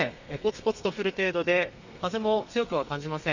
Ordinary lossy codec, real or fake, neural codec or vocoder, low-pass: none; fake; codec, 32 kHz, 1.9 kbps, SNAC; 7.2 kHz